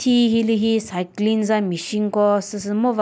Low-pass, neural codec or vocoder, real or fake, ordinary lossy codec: none; none; real; none